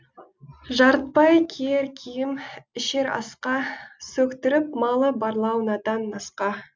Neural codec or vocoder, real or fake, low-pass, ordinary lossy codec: none; real; none; none